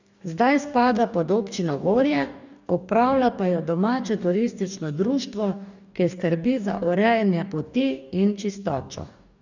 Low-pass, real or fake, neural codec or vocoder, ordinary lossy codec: 7.2 kHz; fake; codec, 44.1 kHz, 2.6 kbps, DAC; none